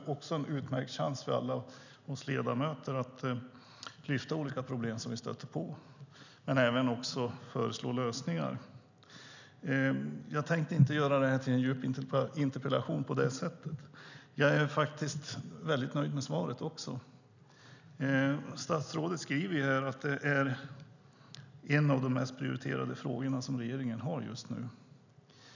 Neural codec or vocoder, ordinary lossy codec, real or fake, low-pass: none; none; real; 7.2 kHz